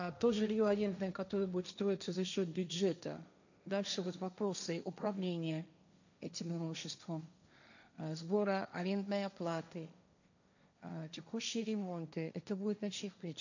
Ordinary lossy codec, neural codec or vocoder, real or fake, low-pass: none; codec, 16 kHz, 1.1 kbps, Voila-Tokenizer; fake; 7.2 kHz